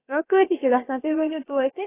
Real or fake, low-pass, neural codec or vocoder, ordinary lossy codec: fake; 3.6 kHz; codec, 16 kHz, about 1 kbps, DyCAST, with the encoder's durations; AAC, 16 kbps